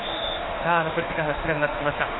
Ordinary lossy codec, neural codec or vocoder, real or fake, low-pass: AAC, 16 kbps; autoencoder, 48 kHz, 32 numbers a frame, DAC-VAE, trained on Japanese speech; fake; 7.2 kHz